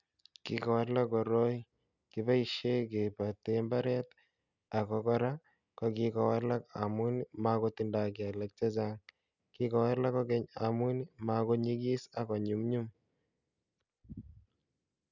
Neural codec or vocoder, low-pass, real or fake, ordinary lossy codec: none; 7.2 kHz; real; none